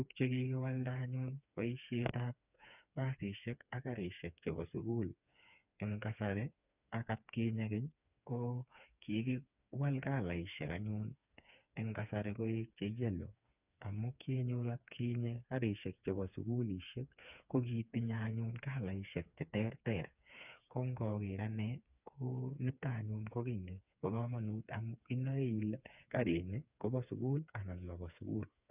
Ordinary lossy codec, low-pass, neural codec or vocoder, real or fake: none; 3.6 kHz; codec, 16 kHz, 4 kbps, FreqCodec, smaller model; fake